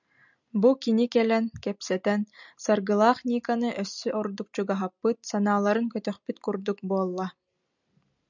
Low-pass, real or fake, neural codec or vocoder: 7.2 kHz; real; none